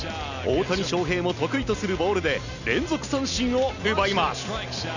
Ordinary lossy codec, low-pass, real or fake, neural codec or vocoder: none; 7.2 kHz; real; none